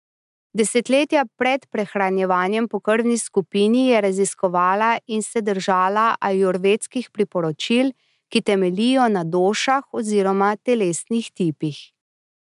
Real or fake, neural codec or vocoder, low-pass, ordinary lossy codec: real; none; 10.8 kHz; MP3, 96 kbps